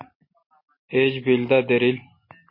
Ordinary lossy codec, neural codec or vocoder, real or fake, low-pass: MP3, 24 kbps; none; real; 5.4 kHz